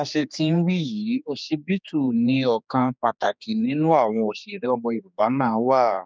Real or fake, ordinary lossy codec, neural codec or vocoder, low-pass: fake; none; codec, 16 kHz, 2 kbps, X-Codec, HuBERT features, trained on general audio; none